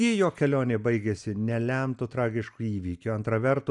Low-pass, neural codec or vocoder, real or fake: 10.8 kHz; none; real